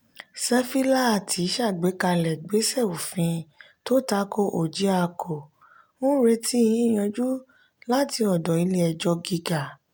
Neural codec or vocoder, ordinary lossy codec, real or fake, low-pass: none; none; real; none